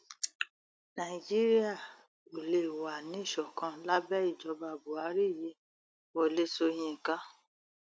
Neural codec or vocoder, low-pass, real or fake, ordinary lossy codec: none; none; real; none